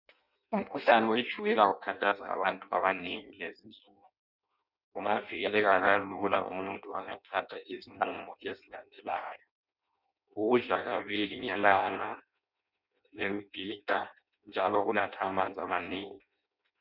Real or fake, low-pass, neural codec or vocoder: fake; 5.4 kHz; codec, 16 kHz in and 24 kHz out, 0.6 kbps, FireRedTTS-2 codec